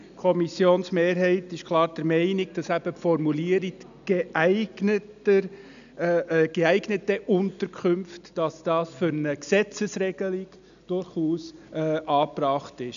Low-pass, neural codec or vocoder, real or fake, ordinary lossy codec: 7.2 kHz; none; real; none